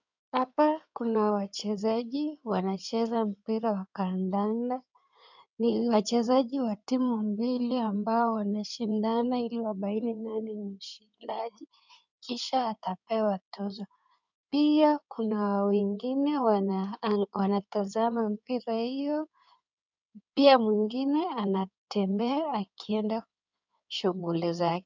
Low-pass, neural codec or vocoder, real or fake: 7.2 kHz; codec, 16 kHz in and 24 kHz out, 2.2 kbps, FireRedTTS-2 codec; fake